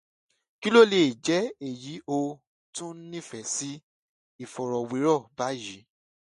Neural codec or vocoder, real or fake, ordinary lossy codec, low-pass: none; real; MP3, 48 kbps; 10.8 kHz